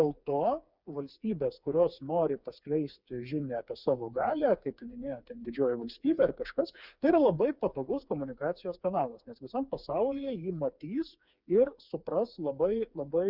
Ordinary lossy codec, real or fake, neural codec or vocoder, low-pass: Opus, 64 kbps; fake; codec, 16 kHz, 4 kbps, FreqCodec, smaller model; 5.4 kHz